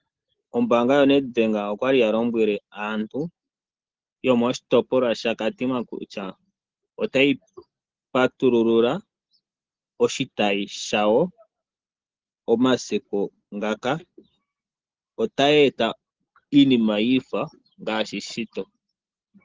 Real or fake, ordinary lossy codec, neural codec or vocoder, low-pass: real; Opus, 16 kbps; none; 7.2 kHz